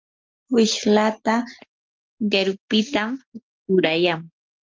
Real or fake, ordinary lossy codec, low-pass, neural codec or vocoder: real; Opus, 24 kbps; 7.2 kHz; none